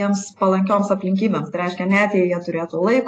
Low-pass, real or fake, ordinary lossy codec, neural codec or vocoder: 9.9 kHz; real; AAC, 32 kbps; none